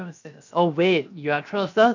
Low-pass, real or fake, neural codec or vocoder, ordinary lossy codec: 7.2 kHz; fake; codec, 16 kHz, 0.7 kbps, FocalCodec; none